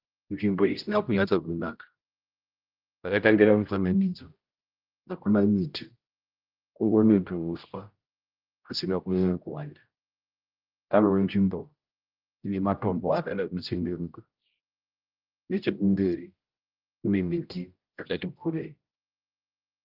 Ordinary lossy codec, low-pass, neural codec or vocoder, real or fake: Opus, 32 kbps; 5.4 kHz; codec, 16 kHz, 0.5 kbps, X-Codec, HuBERT features, trained on general audio; fake